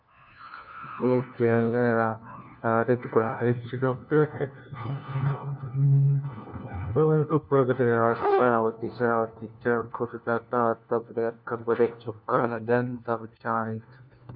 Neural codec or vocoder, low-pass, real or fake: codec, 16 kHz, 1 kbps, FunCodec, trained on LibriTTS, 50 frames a second; 5.4 kHz; fake